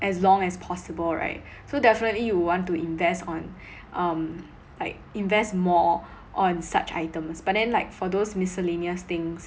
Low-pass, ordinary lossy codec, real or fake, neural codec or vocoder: none; none; real; none